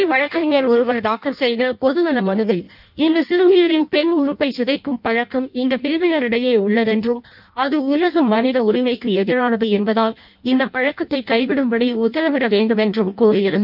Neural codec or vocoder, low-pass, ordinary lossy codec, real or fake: codec, 16 kHz in and 24 kHz out, 0.6 kbps, FireRedTTS-2 codec; 5.4 kHz; none; fake